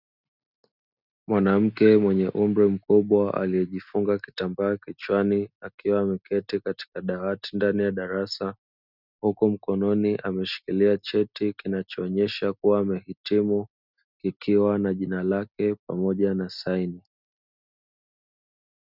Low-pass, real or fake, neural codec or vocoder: 5.4 kHz; real; none